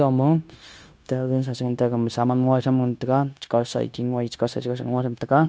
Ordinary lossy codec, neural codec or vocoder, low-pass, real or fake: none; codec, 16 kHz, 0.9 kbps, LongCat-Audio-Codec; none; fake